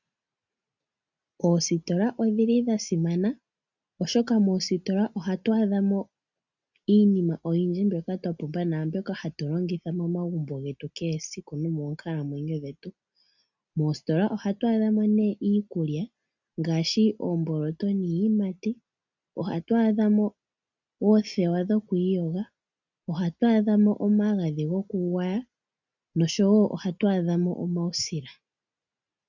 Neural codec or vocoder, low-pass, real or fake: none; 7.2 kHz; real